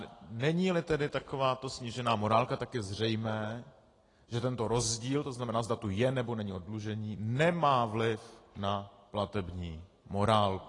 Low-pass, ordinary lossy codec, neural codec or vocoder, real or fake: 10.8 kHz; AAC, 32 kbps; none; real